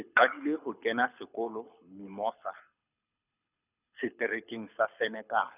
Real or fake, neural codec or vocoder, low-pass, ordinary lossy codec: fake; codec, 24 kHz, 6 kbps, HILCodec; 3.6 kHz; none